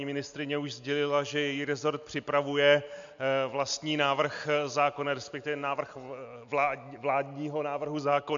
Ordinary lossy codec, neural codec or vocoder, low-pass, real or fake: MP3, 64 kbps; none; 7.2 kHz; real